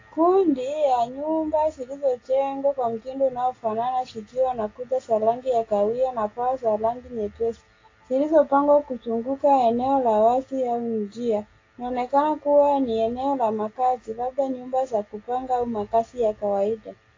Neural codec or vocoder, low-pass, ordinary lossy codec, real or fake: none; 7.2 kHz; AAC, 32 kbps; real